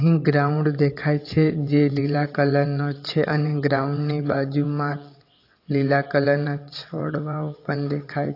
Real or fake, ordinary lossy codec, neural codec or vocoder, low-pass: fake; AAC, 32 kbps; vocoder, 22.05 kHz, 80 mel bands, WaveNeXt; 5.4 kHz